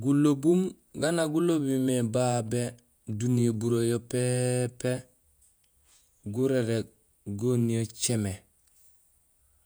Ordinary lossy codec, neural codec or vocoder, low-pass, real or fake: none; vocoder, 48 kHz, 128 mel bands, Vocos; none; fake